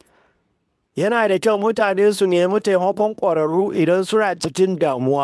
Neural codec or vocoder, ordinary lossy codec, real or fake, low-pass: codec, 24 kHz, 0.9 kbps, WavTokenizer, medium speech release version 2; none; fake; none